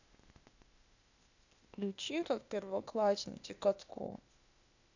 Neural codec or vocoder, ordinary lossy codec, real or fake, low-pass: codec, 16 kHz, 0.8 kbps, ZipCodec; none; fake; 7.2 kHz